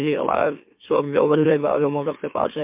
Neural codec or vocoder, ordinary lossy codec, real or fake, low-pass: autoencoder, 44.1 kHz, a latent of 192 numbers a frame, MeloTTS; MP3, 32 kbps; fake; 3.6 kHz